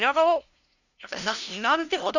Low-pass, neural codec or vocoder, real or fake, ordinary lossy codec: 7.2 kHz; codec, 16 kHz, 0.5 kbps, FunCodec, trained on LibriTTS, 25 frames a second; fake; none